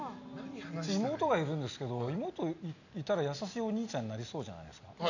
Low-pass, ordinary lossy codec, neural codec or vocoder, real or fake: 7.2 kHz; none; none; real